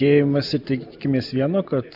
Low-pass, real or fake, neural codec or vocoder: 5.4 kHz; real; none